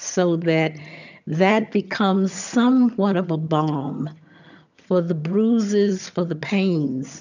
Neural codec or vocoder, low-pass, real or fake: vocoder, 22.05 kHz, 80 mel bands, HiFi-GAN; 7.2 kHz; fake